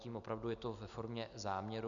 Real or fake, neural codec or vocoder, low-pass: real; none; 7.2 kHz